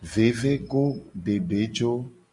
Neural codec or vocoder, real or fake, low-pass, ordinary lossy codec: none; real; 10.8 kHz; Opus, 64 kbps